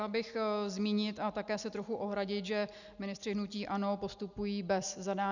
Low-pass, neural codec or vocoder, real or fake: 7.2 kHz; none; real